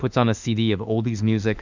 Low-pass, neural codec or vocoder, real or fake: 7.2 kHz; autoencoder, 48 kHz, 32 numbers a frame, DAC-VAE, trained on Japanese speech; fake